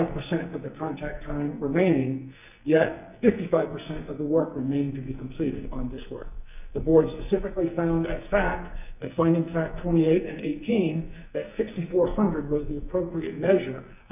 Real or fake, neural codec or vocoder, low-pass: fake; codec, 44.1 kHz, 2.6 kbps, SNAC; 3.6 kHz